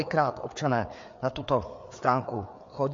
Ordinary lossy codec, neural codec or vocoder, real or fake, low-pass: MP3, 48 kbps; codec, 16 kHz, 4 kbps, FreqCodec, larger model; fake; 7.2 kHz